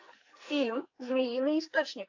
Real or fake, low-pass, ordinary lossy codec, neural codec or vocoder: fake; 7.2 kHz; AAC, 48 kbps; codec, 44.1 kHz, 2.6 kbps, SNAC